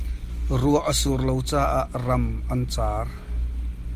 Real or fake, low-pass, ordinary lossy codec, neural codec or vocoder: real; 14.4 kHz; Opus, 32 kbps; none